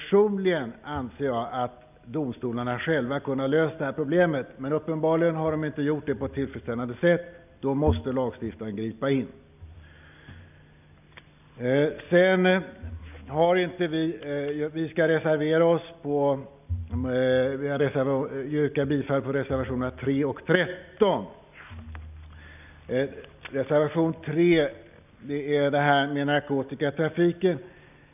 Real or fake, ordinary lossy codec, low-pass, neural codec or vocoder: real; none; 3.6 kHz; none